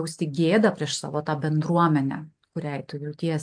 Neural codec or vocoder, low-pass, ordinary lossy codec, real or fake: none; 9.9 kHz; AAC, 64 kbps; real